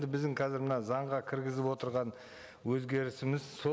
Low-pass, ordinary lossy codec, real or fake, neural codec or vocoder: none; none; real; none